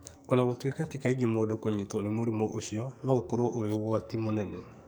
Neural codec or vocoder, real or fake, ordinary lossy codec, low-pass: codec, 44.1 kHz, 2.6 kbps, SNAC; fake; none; none